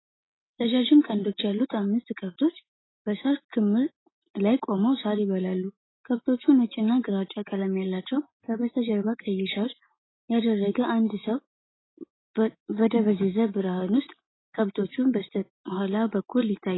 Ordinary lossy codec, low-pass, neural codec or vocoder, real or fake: AAC, 16 kbps; 7.2 kHz; none; real